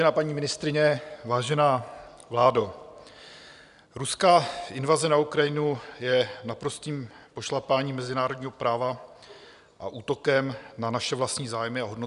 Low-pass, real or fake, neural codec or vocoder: 10.8 kHz; real; none